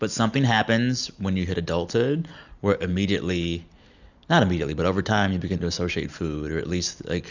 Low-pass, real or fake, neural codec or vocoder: 7.2 kHz; real; none